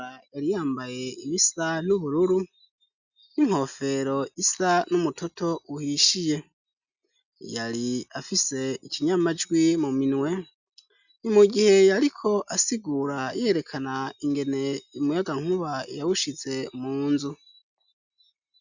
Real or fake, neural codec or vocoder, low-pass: real; none; 7.2 kHz